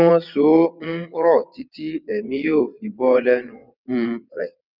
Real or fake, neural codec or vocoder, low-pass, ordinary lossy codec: fake; vocoder, 22.05 kHz, 80 mel bands, WaveNeXt; 5.4 kHz; none